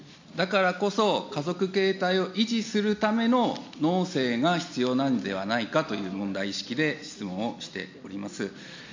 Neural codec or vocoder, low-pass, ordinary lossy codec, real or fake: none; 7.2 kHz; MP3, 48 kbps; real